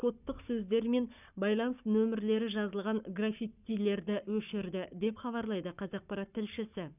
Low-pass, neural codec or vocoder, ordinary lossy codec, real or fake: 3.6 kHz; codec, 44.1 kHz, 7.8 kbps, Pupu-Codec; none; fake